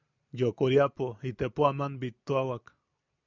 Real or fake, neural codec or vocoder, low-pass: real; none; 7.2 kHz